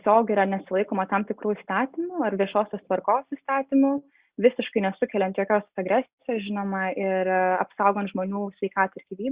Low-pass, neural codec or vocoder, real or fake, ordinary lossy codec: 3.6 kHz; none; real; Opus, 64 kbps